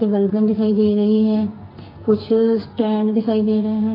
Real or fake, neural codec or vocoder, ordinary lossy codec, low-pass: fake; codec, 44.1 kHz, 2.6 kbps, SNAC; AAC, 24 kbps; 5.4 kHz